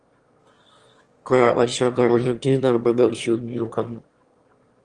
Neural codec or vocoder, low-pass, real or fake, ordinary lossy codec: autoencoder, 22.05 kHz, a latent of 192 numbers a frame, VITS, trained on one speaker; 9.9 kHz; fake; Opus, 24 kbps